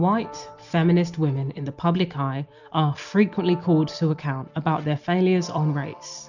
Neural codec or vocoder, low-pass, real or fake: none; 7.2 kHz; real